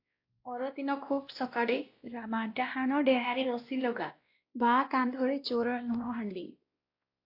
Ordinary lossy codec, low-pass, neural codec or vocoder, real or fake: AAC, 32 kbps; 5.4 kHz; codec, 16 kHz, 1 kbps, X-Codec, WavLM features, trained on Multilingual LibriSpeech; fake